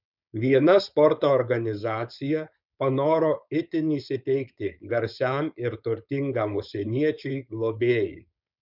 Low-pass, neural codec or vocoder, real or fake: 5.4 kHz; codec, 16 kHz, 4.8 kbps, FACodec; fake